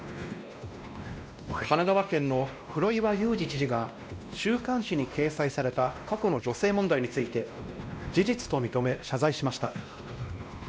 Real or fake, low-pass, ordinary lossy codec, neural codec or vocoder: fake; none; none; codec, 16 kHz, 1 kbps, X-Codec, WavLM features, trained on Multilingual LibriSpeech